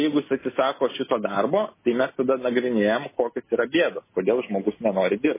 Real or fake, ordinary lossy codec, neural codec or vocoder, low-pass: real; MP3, 16 kbps; none; 3.6 kHz